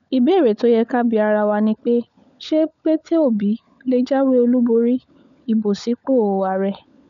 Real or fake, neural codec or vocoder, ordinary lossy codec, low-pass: fake; codec, 16 kHz, 16 kbps, FunCodec, trained on LibriTTS, 50 frames a second; none; 7.2 kHz